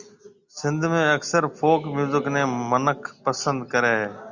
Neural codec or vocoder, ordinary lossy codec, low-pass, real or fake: none; Opus, 64 kbps; 7.2 kHz; real